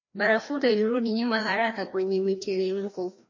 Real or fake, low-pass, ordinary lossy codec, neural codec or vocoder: fake; 7.2 kHz; MP3, 32 kbps; codec, 16 kHz, 1 kbps, FreqCodec, larger model